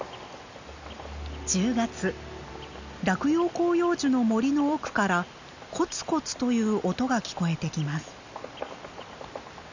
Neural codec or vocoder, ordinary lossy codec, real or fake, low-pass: none; none; real; 7.2 kHz